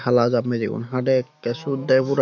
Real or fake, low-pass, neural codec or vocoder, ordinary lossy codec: fake; 7.2 kHz; autoencoder, 48 kHz, 128 numbers a frame, DAC-VAE, trained on Japanese speech; none